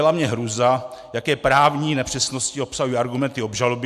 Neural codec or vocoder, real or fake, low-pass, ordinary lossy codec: none; real; 14.4 kHz; AAC, 96 kbps